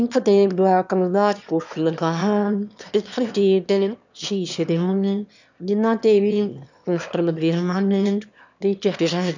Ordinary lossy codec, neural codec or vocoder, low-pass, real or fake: none; autoencoder, 22.05 kHz, a latent of 192 numbers a frame, VITS, trained on one speaker; 7.2 kHz; fake